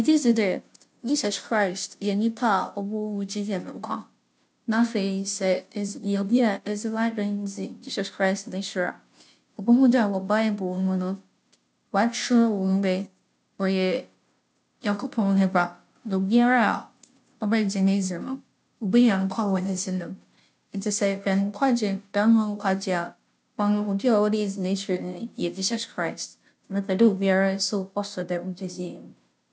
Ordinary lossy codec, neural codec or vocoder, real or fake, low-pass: none; codec, 16 kHz, 0.5 kbps, FunCodec, trained on Chinese and English, 25 frames a second; fake; none